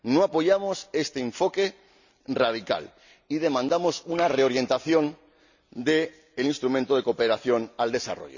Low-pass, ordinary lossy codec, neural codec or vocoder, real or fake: 7.2 kHz; none; none; real